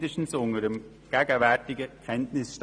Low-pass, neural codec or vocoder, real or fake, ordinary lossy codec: 9.9 kHz; none; real; none